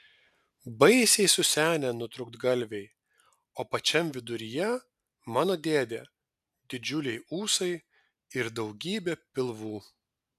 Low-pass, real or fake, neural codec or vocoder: 14.4 kHz; real; none